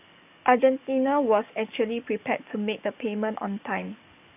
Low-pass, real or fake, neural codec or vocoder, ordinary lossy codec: 3.6 kHz; fake; codec, 44.1 kHz, 7.8 kbps, DAC; AAC, 32 kbps